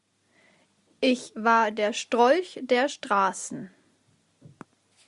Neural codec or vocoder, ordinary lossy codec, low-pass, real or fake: none; Opus, 64 kbps; 10.8 kHz; real